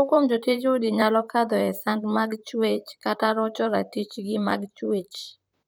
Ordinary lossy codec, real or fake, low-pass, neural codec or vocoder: none; fake; none; vocoder, 44.1 kHz, 128 mel bands, Pupu-Vocoder